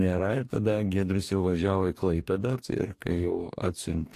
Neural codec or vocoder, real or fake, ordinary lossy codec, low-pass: codec, 44.1 kHz, 2.6 kbps, DAC; fake; AAC, 64 kbps; 14.4 kHz